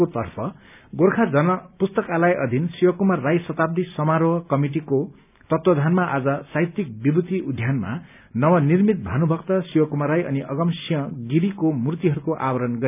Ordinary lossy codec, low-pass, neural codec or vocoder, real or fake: none; 3.6 kHz; none; real